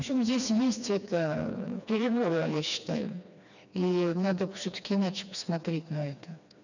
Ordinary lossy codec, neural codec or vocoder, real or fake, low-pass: none; codec, 16 kHz, 2 kbps, FreqCodec, smaller model; fake; 7.2 kHz